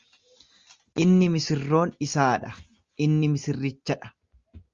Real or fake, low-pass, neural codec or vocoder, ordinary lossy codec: real; 7.2 kHz; none; Opus, 32 kbps